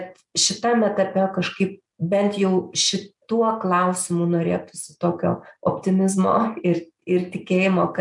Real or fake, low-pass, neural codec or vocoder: real; 10.8 kHz; none